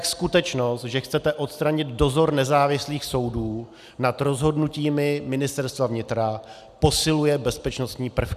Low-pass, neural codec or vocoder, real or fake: 14.4 kHz; none; real